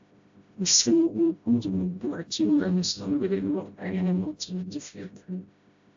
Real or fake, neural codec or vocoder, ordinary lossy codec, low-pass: fake; codec, 16 kHz, 0.5 kbps, FreqCodec, smaller model; AAC, 64 kbps; 7.2 kHz